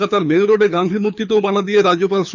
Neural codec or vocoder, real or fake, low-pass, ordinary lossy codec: codec, 24 kHz, 6 kbps, HILCodec; fake; 7.2 kHz; none